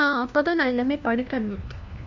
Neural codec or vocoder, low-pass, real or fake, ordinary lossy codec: codec, 16 kHz, 1 kbps, FunCodec, trained on LibriTTS, 50 frames a second; 7.2 kHz; fake; none